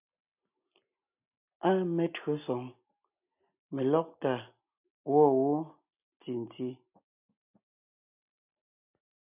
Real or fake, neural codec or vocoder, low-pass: real; none; 3.6 kHz